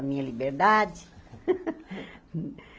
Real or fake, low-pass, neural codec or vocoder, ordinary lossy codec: real; none; none; none